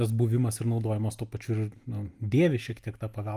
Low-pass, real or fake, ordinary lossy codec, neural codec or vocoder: 14.4 kHz; real; Opus, 32 kbps; none